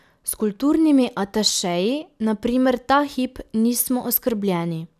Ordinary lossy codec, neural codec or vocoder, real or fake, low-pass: none; none; real; 14.4 kHz